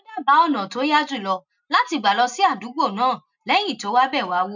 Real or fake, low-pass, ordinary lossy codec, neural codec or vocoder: real; 7.2 kHz; none; none